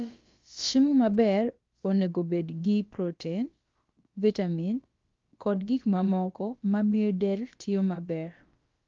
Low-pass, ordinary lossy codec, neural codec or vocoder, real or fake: 7.2 kHz; Opus, 32 kbps; codec, 16 kHz, about 1 kbps, DyCAST, with the encoder's durations; fake